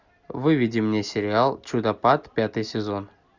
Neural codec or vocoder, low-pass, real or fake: none; 7.2 kHz; real